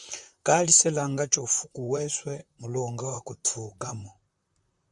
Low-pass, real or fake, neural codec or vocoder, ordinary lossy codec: 10.8 kHz; fake; vocoder, 44.1 kHz, 128 mel bands, Pupu-Vocoder; Opus, 64 kbps